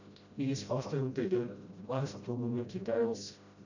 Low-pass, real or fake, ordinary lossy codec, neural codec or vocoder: 7.2 kHz; fake; none; codec, 16 kHz, 0.5 kbps, FreqCodec, smaller model